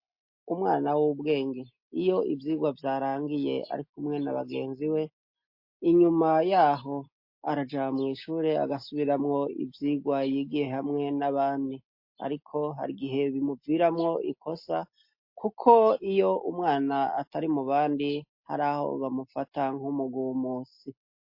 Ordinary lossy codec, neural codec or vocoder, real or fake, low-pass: MP3, 32 kbps; none; real; 5.4 kHz